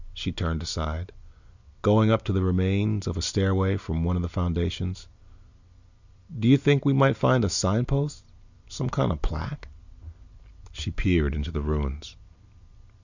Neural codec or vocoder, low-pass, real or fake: none; 7.2 kHz; real